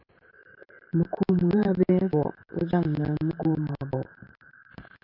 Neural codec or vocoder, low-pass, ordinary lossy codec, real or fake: vocoder, 44.1 kHz, 128 mel bands every 512 samples, BigVGAN v2; 5.4 kHz; MP3, 48 kbps; fake